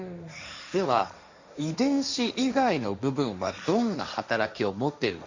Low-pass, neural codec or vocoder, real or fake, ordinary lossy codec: 7.2 kHz; codec, 16 kHz, 1.1 kbps, Voila-Tokenizer; fake; Opus, 64 kbps